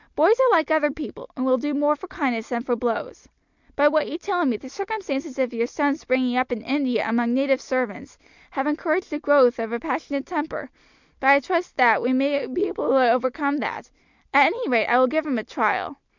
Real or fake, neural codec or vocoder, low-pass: real; none; 7.2 kHz